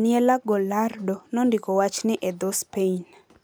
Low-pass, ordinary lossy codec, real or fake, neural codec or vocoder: none; none; real; none